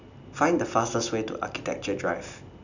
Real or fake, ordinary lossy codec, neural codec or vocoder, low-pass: real; none; none; 7.2 kHz